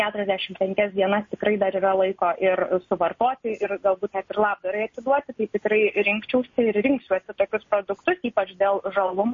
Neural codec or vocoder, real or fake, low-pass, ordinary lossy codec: none; real; 7.2 kHz; MP3, 32 kbps